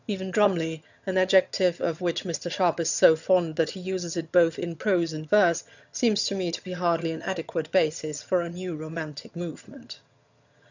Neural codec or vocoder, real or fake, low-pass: vocoder, 22.05 kHz, 80 mel bands, HiFi-GAN; fake; 7.2 kHz